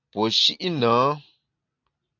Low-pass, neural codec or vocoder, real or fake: 7.2 kHz; none; real